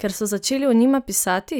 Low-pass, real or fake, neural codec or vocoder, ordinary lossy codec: none; real; none; none